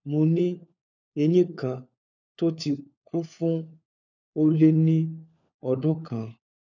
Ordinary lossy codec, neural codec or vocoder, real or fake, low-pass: none; codec, 16 kHz, 4 kbps, FunCodec, trained on LibriTTS, 50 frames a second; fake; 7.2 kHz